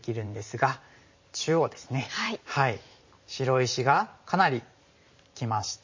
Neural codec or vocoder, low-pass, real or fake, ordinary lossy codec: vocoder, 44.1 kHz, 128 mel bands every 512 samples, BigVGAN v2; 7.2 kHz; fake; MP3, 32 kbps